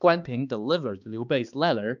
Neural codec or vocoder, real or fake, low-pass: codec, 16 kHz, 2 kbps, X-Codec, HuBERT features, trained on balanced general audio; fake; 7.2 kHz